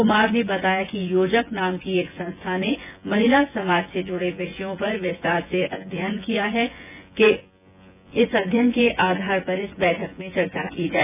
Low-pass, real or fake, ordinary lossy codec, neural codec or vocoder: 3.6 kHz; fake; none; vocoder, 24 kHz, 100 mel bands, Vocos